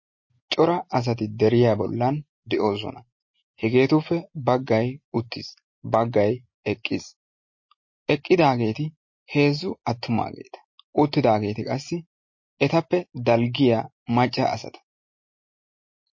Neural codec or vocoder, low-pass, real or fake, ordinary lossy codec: none; 7.2 kHz; real; MP3, 32 kbps